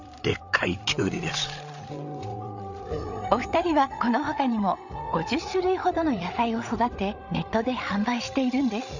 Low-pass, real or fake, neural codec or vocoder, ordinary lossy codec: 7.2 kHz; fake; codec, 16 kHz, 8 kbps, FreqCodec, larger model; none